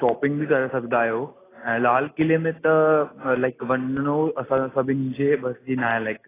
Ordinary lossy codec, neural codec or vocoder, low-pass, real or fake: AAC, 16 kbps; none; 3.6 kHz; real